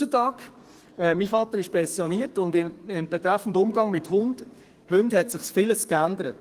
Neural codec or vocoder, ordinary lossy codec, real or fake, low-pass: codec, 32 kHz, 1.9 kbps, SNAC; Opus, 24 kbps; fake; 14.4 kHz